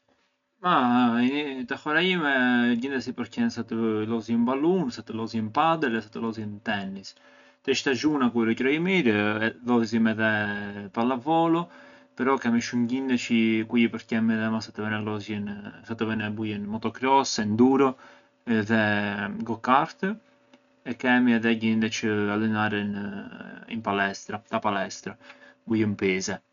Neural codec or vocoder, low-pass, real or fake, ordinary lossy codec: none; 7.2 kHz; real; none